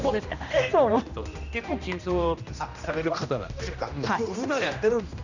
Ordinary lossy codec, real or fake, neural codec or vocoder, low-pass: none; fake; codec, 16 kHz, 1 kbps, X-Codec, HuBERT features, trained on general audio; 7.2 kHz